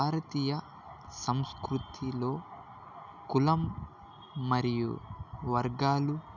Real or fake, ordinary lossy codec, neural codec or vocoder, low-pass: real; none; none; 7.2 kHz